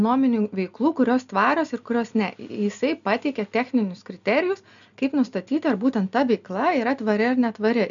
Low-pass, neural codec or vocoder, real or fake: 7.2 kHz; none; real